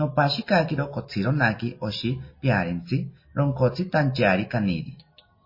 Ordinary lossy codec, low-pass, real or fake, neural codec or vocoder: MP3, 24 kbps; 5.4 kHz; real; none